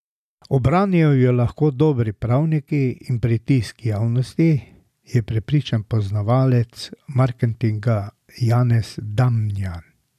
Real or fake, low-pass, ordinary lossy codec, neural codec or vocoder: real; 14.4 kHz; none; none